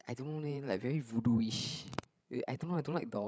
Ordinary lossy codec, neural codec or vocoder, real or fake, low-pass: none; codec, 16 kHz, 16 kbps, FreqCodec, larger model; fake; none